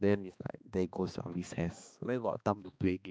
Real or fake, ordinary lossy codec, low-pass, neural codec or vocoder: fake; none; none; codec, 16 kHz, 2 kbps, X-Codec, HuBERT features, trained on balanced general audio